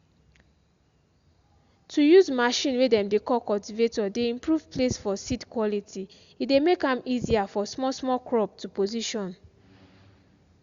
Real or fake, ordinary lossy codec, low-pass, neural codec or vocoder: real; Opus, 64 kbps; 7.2 kHz; none